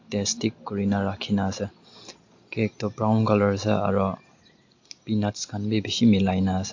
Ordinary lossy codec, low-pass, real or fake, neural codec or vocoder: AAC, 48 kbps; 7.2 kHz; real; none